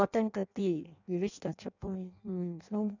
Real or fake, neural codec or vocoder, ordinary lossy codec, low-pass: fake; codec, 16 kHz in and 24 kHz out, 0.6 kbps, FireRedTTS-2 codec; none; 7.2 kHz